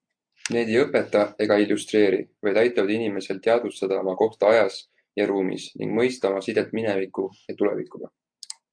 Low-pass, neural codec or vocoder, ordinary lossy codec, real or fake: 9.9 kHz; none; AAC, 64 kbps; real